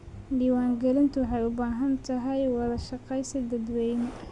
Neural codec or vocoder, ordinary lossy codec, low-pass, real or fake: none; Opus, 64 kbps; 10.8 kHz; real